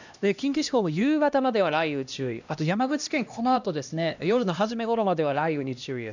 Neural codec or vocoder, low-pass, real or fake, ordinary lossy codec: codec, 16 kHz, 1 kbps, X-Codec, HuBERT features, trained on LibriSpeech; 7.2 kHz; fake; none